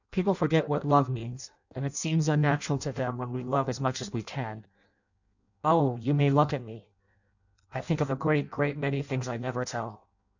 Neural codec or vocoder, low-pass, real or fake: codec, 16 kHz in and 24 kHz out, 0.6 kbps, FireRedTTS-2 codec; 7.2 kHz; fake